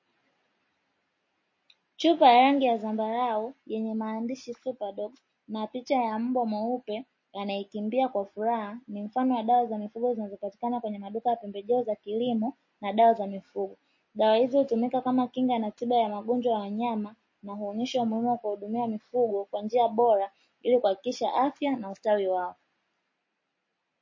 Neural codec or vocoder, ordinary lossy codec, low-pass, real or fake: none; MP3, 32 kbps; 7.2 kHz; real